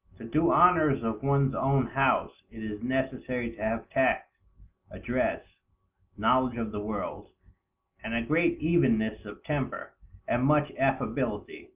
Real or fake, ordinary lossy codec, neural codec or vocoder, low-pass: real; Opus, 24 kbps; none; 3.6 kHz